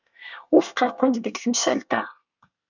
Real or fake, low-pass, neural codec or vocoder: fake; 7.2 kHz; codec, 24 kHz, 1 kbps, SNAC